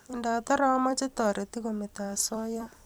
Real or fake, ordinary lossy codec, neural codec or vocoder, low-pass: fake; none; vocoder, 44.1 kHz, 128 mel bands every 256 samples, BigVGAN v2; none